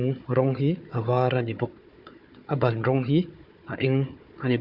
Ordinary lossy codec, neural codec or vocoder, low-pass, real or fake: none; codec, 16 kHz, 4 kbps, FunCodec, trained on Chinese and English, 50 frames a second; 5.4 kHz; fake